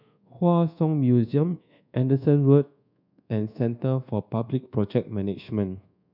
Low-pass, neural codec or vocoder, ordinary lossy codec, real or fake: 5.4 kHz; codec, 24 kHz, 1.2 kbps, DualCodec; none; fake